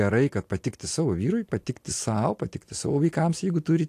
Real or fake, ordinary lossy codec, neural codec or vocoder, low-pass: real; AAC, 64 kbps; none; 14.4 kHz